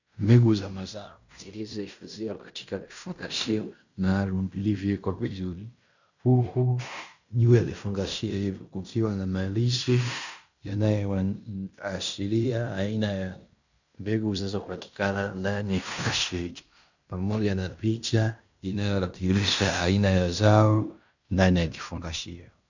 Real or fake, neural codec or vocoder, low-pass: fake; codec, 16 kHz in and 24 kHz out, 0.9 kbps, LongCat-Audio-Codec, fine tuned four codebook decoder; 7.2 kHz